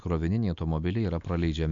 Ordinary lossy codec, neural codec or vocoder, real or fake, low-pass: MP3, 64 kbps; none; real; 7.2 kHz